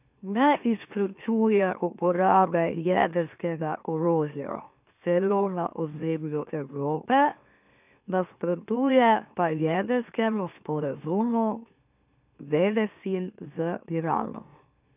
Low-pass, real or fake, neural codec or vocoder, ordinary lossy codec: 3.6 kHz; fake; autoencoder, 44.1 kHz, a latent of 192 numbers a frame, MeloTTS; none